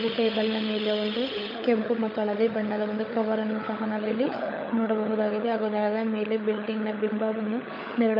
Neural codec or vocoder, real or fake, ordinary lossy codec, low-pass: codec, 16 kHz, 16 kbps, FunCodec, trained on LibriTTS, 50 frames a second; fake; none; 5.4 kHz